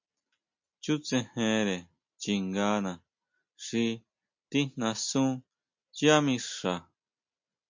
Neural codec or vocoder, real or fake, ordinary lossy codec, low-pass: none; real; MP3, 48 kbps; 7.2 kHz